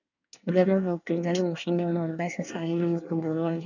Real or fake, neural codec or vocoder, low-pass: fake; codec, 24 kHz, 1 kbps, SNAC; 7.2 kHz